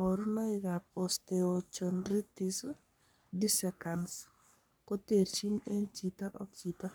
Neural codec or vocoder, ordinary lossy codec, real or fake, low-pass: codec, 44.1 kHz, 3.4 kbps, Pupu-Codec; none; fake; none